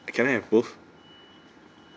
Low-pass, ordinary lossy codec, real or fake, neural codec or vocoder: none; none; real; none